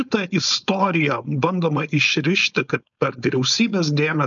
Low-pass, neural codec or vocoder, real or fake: 7.2 kHz; codec, 16 kHz, 4.8 kbps, FACodec; fake